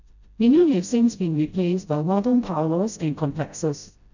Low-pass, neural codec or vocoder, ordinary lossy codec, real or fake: 7.2 kHz; codec, 16 kHz, 0.5 kbps, FreqCodec, smaller model; none; fake